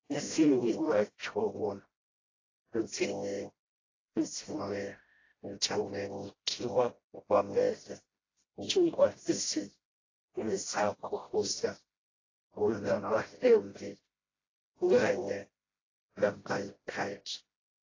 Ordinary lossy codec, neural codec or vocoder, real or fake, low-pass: AAC, 32 kbps; codec, 16 kHz, 0.5 kbps, FreqCodec, smaller model; fake; 7.2 kHz